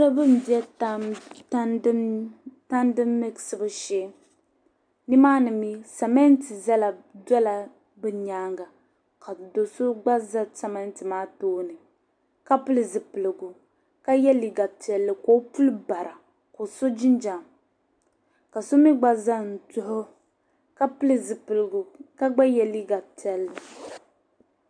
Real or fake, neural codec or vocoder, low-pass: real; none; 9.9 kHz